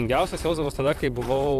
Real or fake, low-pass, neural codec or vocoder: fake; 14.4 kHz; vocoder, 44.1 kHz, 128 mel bands, Pupu-Vocoder